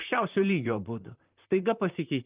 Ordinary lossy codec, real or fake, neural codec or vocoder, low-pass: Opus, 24 kbps; fake; vocoder, 44.1 kHz, 128 mel bands, Pupu-Vocoder; 3.6 kHz